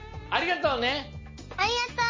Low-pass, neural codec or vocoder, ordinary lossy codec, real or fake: 7.2 kHz; none; MP3, 32 kbps; real